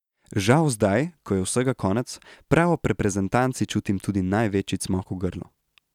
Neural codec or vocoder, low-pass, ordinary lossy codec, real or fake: none; 19.8 kHz; none; real